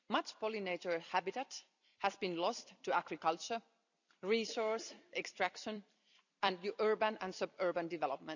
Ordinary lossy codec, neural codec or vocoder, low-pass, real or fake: none; vocoder, 44.1 kHz, 128 mel bands every 256 samples, BigVGAN v2; 7.2 kHz; fake